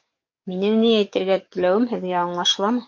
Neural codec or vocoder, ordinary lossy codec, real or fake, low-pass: codec, 44.1 kHz, 7.8 kbps, DAC; MP3, 48 kbps; fake; 7.2 kHz